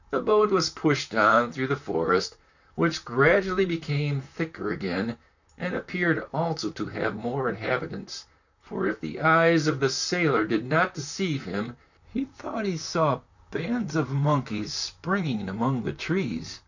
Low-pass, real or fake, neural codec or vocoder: 7.2 kHz; fake; vocoder, 44.1 kHz, 128 mel bands, Pupu-Vocoder